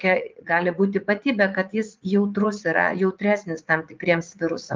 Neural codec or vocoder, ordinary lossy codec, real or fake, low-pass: vocoder, 44.1 kHz, 80 mel bands, Vocos; Opus, 32 kbps; fake; 7.2 kHz